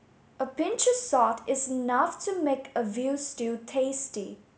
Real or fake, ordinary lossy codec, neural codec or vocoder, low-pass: real; none; none; none